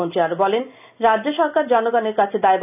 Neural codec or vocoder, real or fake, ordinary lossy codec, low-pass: none; real; none; 3.6 kHz